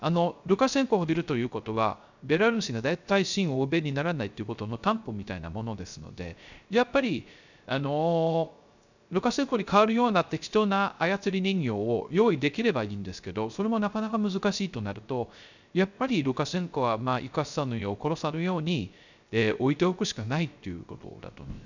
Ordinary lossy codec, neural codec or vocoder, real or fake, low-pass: none; codec, 16 kHz, 0.3 kbps, FocalCodec; fake; 7.2 kHz